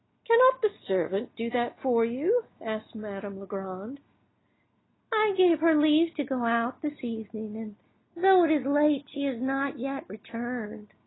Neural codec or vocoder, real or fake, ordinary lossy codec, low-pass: none; real; AAC, 16 kbps; 7.2 kHz